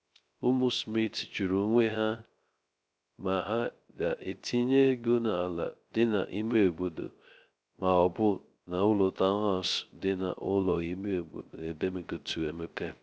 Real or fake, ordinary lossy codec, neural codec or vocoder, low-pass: fake; none; codec, 16 kHz, 0.3 kbps, FocalCodec; none